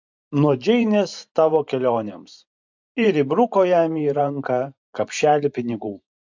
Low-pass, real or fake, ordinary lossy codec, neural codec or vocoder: 7.2 kHz; fake; MP3, 64 kbps; vocoder, 44.1 kHz, 128 mel bands every 512 samples, BigVGAN v2